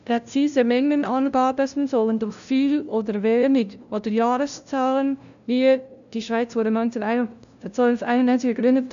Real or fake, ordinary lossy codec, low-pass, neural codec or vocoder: fake; none; 7.2 kHz; codec, 16 kHz, 0.5 kbps, FunCodec, trained on LibriTTS, 25 frames a second